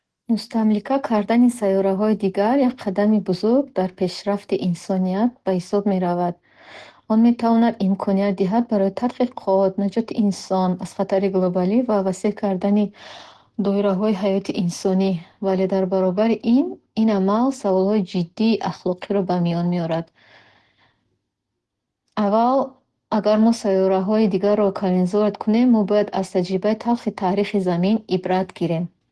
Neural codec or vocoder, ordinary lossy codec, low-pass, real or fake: none; Opus, 16 kbps; 10.8 kHz; real